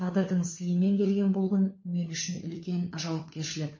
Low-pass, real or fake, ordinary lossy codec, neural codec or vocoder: 7.2 kHz; fake; MP3, 32 kbps; codec, 16 kHz, 4 kbps, FunCodec, trained on LibriTTS, 50 frames a second